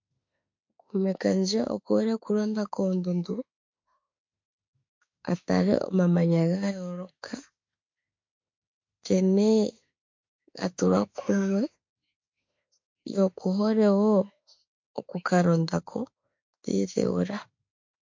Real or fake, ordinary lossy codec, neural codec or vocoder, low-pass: fake; MP3, 48 kbps; autoencoder, 48 kHz, 32 numbers a frame, DAC-VAE, trained on Japanese speech; 7.2 kHz